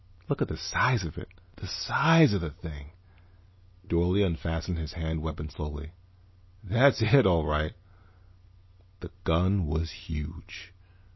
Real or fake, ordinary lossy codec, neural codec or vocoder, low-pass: real; MP3, 24 kbps; none; 7.2 kHz